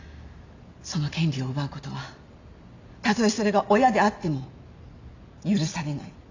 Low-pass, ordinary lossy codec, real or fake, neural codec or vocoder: 7.2 kHz; AAC, 48 kbps; real; none